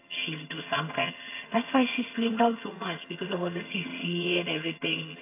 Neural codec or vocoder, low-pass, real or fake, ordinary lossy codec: vocoder, 22.05 kHz, 80 mel bands, HiFi-GAN; 3.6 kHz; fake; AAC, 24 kbps